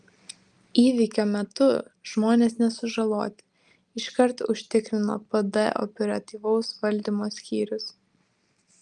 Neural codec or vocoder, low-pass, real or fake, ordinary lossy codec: none; 10.8 kHz; real; Opus, 32 kbps